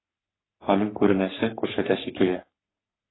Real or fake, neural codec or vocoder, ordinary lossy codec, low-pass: fake; codec, 44.1 kHz, 3.4 kbps, Pupu-Codec; AAC, 16 kbps; 7.2 kHz